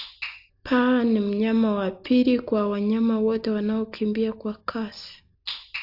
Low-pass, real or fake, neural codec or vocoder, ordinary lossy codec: 5.4 kHz; real; none; AAC, 48 kbps